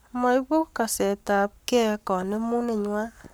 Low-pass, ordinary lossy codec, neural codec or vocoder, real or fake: none; none; codec, 44.1 kHz, 7.8 kbps, Pupu-Codec; fake